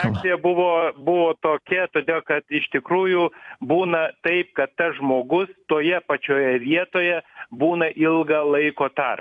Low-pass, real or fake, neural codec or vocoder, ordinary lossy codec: 10.8 kHz; fake; vocoder, 44.1 kHz, 128 mel bands every 256 samples, BigVGAN v2; AAC, 64 kbps